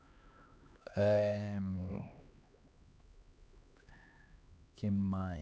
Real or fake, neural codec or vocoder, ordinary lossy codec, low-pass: fake; codec, 16 kHz, 2 kbps, X-Codec, HuBERT features, trained on LibriSpeech; none; none